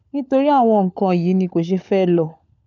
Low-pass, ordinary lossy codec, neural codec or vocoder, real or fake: 7.2 kHz; none; codec, 16 kHz, 16 kbps, FunCodec, trained on LibriTTS, 50 frames a second; fake